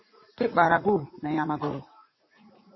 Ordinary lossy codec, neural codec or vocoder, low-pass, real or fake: MP3, 24 kbps; vocoder, 44.1 kHz, 80 mel bands, Vocos; 7.2 kHz; fake